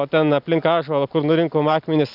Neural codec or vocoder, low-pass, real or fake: none; 5.4 kHz; real